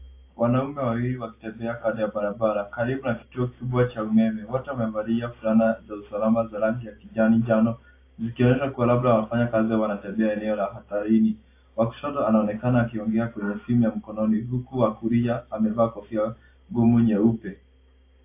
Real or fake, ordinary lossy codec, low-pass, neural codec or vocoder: real; AAC, 24 kbps; 3.6 kHz; none